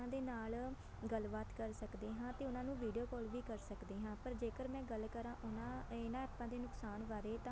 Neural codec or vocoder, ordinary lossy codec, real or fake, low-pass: none; none; real; none